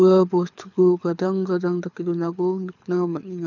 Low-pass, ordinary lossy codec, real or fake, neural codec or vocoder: 7.2 kHz; none; fake; codec, 24 kHz, 6 kbps, HILCodec